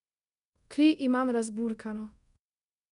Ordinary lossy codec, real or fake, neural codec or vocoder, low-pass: none; fake; codec, 24 kHz, 0.5 kbps, DualCodec; 10.8 kHz